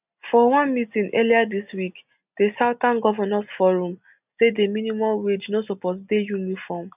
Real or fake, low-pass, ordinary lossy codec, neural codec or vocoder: real; 3.6 kHz; none; none